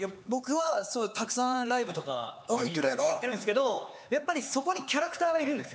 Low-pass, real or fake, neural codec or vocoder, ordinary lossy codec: none; fake; codec, 16 kHz, 4 kbps, X-Codec, HuBERT features, trained on LibriSpeech; none